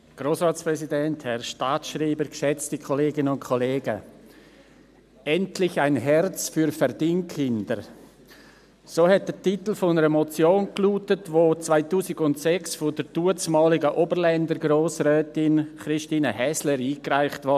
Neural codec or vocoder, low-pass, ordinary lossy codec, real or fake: none; 14.4 kHz; none; real